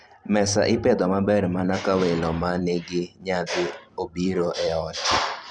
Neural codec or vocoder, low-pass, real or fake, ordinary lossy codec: vocoder, 44.1 kHz, 128 mel bands every 256 samples, BigVGAN v2; 9.9 kHz; fake; Opus, 64 kbps